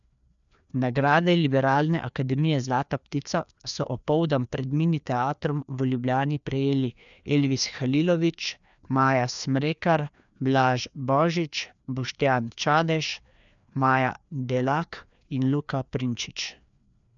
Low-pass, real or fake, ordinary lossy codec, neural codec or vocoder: 7.2 kHz; fake; none; codec, 16 kHz, 2 kbps, FreqCodec, larger model